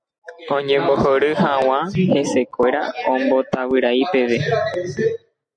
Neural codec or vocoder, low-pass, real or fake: none; 9.9 kHz; real